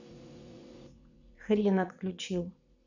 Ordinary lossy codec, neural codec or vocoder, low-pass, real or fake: none; vocoder, 44.1 kHz, 80 mel bands, Vocos; 7.2 kHz; fake